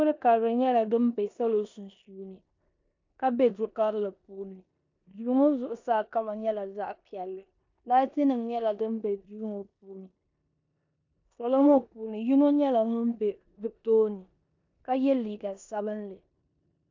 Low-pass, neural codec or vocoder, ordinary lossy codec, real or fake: 7.2 kHz; codec, 16 kHz in and 24 kHz out, 0.9 kbps, LongCat-Audio-Codec, four codebook decoder; AAC, 48 kbps; fake